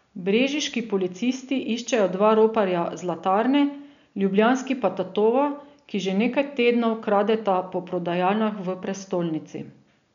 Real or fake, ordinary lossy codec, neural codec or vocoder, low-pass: real; none; none; 7.2 kHz